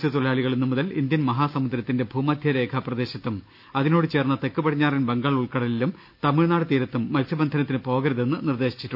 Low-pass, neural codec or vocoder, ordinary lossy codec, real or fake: 5.4 kHz; none; none; real